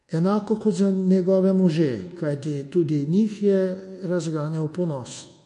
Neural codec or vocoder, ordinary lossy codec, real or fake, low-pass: codec, 24 kHz, 1.2 kbps, DualCodec; MP3, 48 kbps; fake; 10.8 kHz